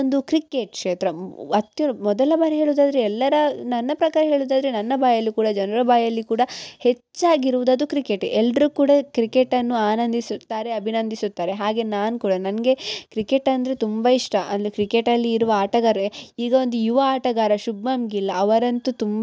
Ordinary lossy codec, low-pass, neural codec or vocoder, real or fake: none; none; none; real